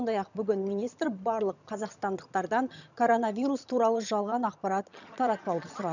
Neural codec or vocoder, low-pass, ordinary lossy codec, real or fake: vocoder, 22.05 kHz, 80 mel bands, HiFi-GAN; 7.2 kHz; none; fake